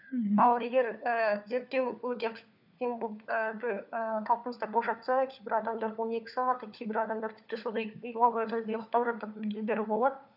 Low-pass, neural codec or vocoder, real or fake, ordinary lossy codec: 5.4 kHz; codec, 16 kHz, 4 kbps, FunCodec, trained on LibriTTS, 50 frames a second; fake; none